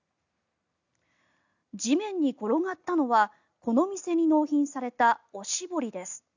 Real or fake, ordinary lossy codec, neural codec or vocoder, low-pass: real; none; none; 7.2 kHz